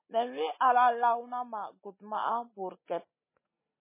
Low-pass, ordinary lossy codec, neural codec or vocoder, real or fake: 3.6 kHz; MP3, 16 kbps; none; real